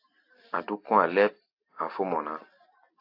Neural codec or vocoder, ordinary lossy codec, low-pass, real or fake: none; AAC, 32 kbps; 5.4 kHz; real